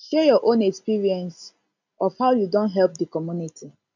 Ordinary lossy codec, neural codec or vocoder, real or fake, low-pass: none; none; real; 7.2 kHz